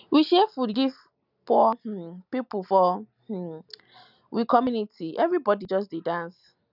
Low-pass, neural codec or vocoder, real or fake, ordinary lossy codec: 5.4 kHz; none; real; none